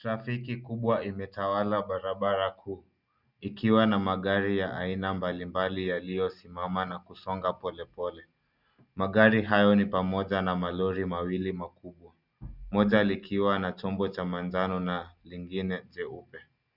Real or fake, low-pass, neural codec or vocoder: real; 5.4 kHz; none